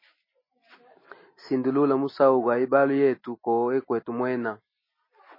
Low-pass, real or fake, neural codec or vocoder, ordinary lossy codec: 5.4 kHz; real; none; MP3, 24 kbps